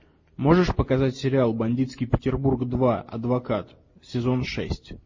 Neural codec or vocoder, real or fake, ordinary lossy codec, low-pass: none; real; MP3, 32 kbps; 7.2 kHz